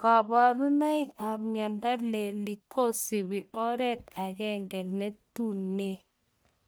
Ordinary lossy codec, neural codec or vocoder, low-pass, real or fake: none; codec, 44.1 kHz, 1.7 kbps, Pupu-Codec; none; fake